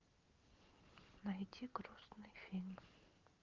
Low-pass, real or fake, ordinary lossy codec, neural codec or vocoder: 7.2 kHz; real; Opus, 24 kbps; none